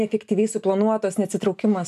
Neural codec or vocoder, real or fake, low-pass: none; real; 14.4 kHz